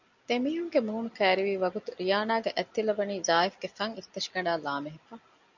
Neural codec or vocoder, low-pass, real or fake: none; 7.2 kHz; real